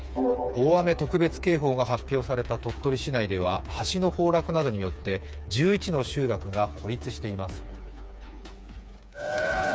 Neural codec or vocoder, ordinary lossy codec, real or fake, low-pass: codec, 16 kHz, 4 kbps, FreqCodec, smaller model; none; fake; none